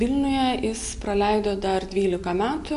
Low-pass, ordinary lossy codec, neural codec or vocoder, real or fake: 10.8 kHz; MP3, 64 kbps; none; real